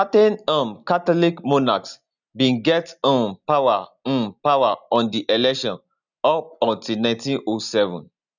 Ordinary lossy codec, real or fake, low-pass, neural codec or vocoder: none; real; 7.2 kHz; none